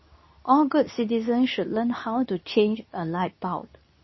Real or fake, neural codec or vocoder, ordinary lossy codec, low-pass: fake; codec, 24 kHz, 0.9 kbps, WavTokenizer, medium speech release version 2; MP3, 24 kbps; 7.2 kHz